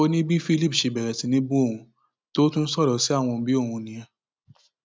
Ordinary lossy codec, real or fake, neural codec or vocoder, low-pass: none; real; none; none